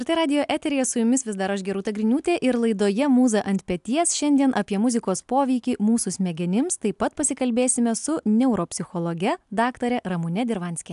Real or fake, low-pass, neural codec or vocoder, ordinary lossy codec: real; 10.8 kHz; none; AAC, 96 kbps